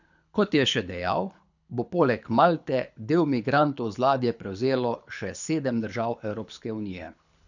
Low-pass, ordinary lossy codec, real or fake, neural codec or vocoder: 7.2 kHz; none; fake; codec, 24 kHz, 6 kbps, HILCodec